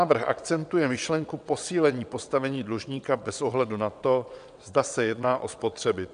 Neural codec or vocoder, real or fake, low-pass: vocoder, 22.05 kHz, 80 mel bands, WaveNeXt; fake; 9.9 kHz